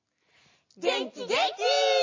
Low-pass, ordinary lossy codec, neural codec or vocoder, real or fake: 7.2 kHz; MP3, 32 kbps; none; real